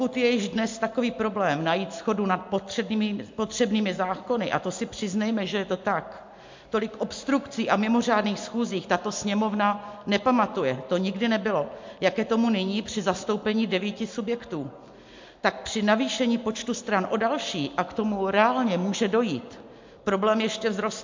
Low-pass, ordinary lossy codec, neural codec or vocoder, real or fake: 7.2 kHz; MP3, 48 kbps; none; real